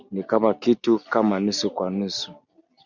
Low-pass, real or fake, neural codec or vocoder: 7.2 kHz; real; none